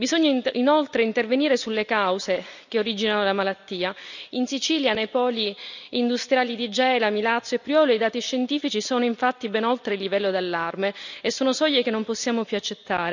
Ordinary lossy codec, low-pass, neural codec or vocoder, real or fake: none; 7.2 kHz; none; real